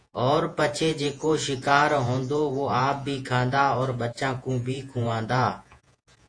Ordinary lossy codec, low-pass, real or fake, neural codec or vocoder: AAC, 48 kbps; 9.9 kHz; fake; vocoder, 48 kHz, 128 mel bands, Vocos